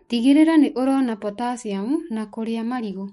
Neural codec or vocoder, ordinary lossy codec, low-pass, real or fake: autoencoder, 48 kHz, 128 numbers a frame, DAC-VAE, trained on Japanese speech; MP3, 48 kbps; 19.8 kHz; fake